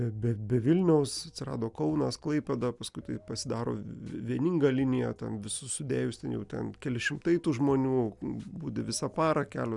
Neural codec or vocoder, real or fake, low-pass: none; real; 10.8 kHz